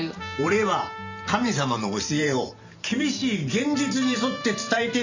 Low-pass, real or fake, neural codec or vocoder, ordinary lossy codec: 7.2 kHz; fake; vocoder, 44.1 kHz, 128 mel bands every 512 samples, BigVGAN v2; Opus, 64 kbps